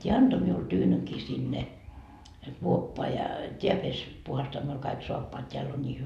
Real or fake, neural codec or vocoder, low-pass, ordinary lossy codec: real; none; 14.4 kHz; none